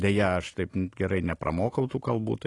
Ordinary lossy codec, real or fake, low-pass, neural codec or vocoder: AAC, 32 kbps; real; 10.8 kHz; none